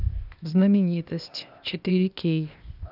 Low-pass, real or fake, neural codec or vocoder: 5.4 kHz; fake; codec, 16 kHz, 0.8 kbps, ZipCodec